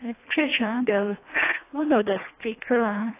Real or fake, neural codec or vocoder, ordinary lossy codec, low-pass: fake; codec, 24 kHz, 1.5 kbps, HILCodec; AAC, 24 kbps; 3.6 kHz